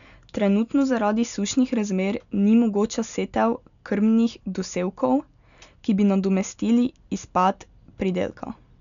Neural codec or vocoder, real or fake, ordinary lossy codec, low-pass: none; real; none; 7.2 kHz